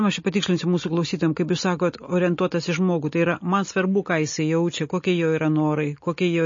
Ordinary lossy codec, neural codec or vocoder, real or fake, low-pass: MP3, 32 kbps; none; real; 7.2 kHz